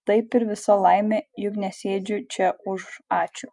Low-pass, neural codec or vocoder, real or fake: 10.8 kHz; none; real